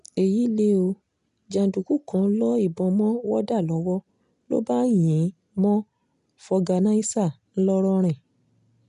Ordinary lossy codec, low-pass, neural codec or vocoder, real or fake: none; 10.8 kHz; none; real